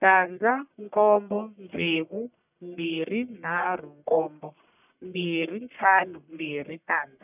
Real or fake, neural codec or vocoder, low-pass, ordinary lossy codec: fake; codec, 44.1 kHz, 1.7 kbps, Pupu-Codec; 3.6 kHz; none